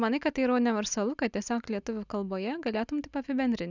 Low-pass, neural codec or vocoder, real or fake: 7.2 kHz; none; real